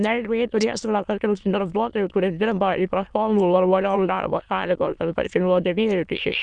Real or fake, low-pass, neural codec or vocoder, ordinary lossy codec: fake; 9.9 kHz; autoencoder, 22.05 kHz, a latent of 192 numbers a frame, VITS, trained on many speakers; Opus, 64 kbps